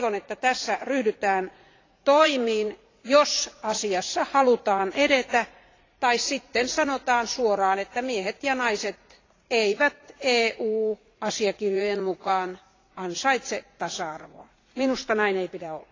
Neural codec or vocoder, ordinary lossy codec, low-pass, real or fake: vocoder, 44.1 kHz, 80 mel bands, Vocos; AAC, 32 kbps; 7.2 kHz; fake